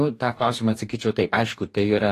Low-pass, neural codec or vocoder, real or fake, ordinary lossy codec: 14.4 kHz; codec, 44.1 kHz, 2.6 kbps, DAC; fake; AAC, 48 kbps